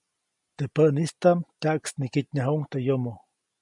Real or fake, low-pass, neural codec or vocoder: real; 10.8 kHz; none